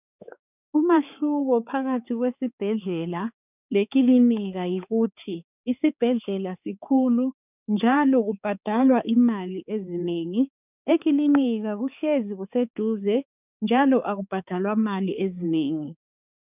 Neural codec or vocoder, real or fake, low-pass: codec, 16 kHz, 4 kbps, X-Codec, HuBERT features, trained on balanced general audio; fake; 3.6 kHz